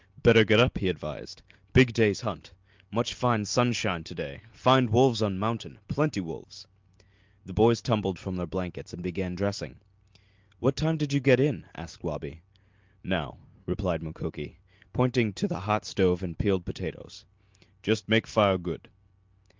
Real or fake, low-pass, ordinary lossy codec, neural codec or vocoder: real; 7.2 kHz; Opus, 24 kbps; none